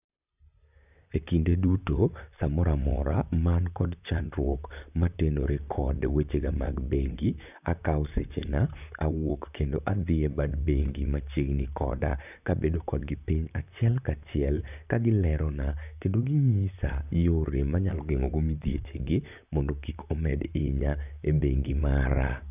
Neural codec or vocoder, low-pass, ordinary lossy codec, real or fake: vocoder, 44.1 kHz, 128 mel bands, Pupu-Vocoder; 3.6 kHz; none; fake